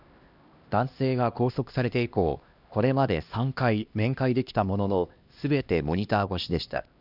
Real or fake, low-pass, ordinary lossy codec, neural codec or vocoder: fake; 5.4 kHz; none; codec, 16 kHz, 1 kbps, X-Codec, HuBERT features, trained on LibriSpeech